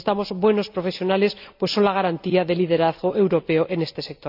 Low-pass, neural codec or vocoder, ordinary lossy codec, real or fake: 5.4 kHz; none; none; real